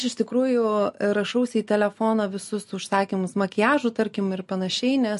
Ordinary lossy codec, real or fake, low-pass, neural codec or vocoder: MP3, 48 kbps; real; 14.4 kHz; none